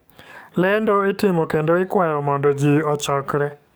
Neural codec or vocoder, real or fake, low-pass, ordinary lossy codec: codec, 44.1 kHz, 7.8 kbps, DAC; fake; none; none